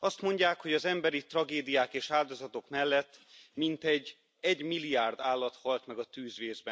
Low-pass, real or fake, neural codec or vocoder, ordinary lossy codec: none; real; none; none